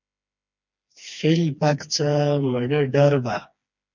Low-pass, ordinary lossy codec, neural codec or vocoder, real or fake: 7.2 kHz; MP3, 64 kbps; codec, 16 kHz, 2 kbps, FreqCodec, smaller model; fake